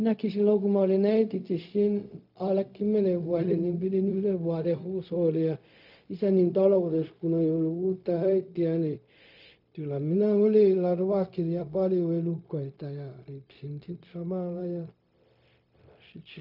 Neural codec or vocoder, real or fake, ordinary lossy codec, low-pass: codec, 16 kHz, 0.4 kbps, LongCat-Audio-Codec; fake; AAC, 48 kbps; 5.4 kHz